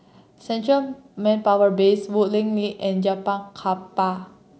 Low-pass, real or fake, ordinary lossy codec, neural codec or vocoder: none; real; none; none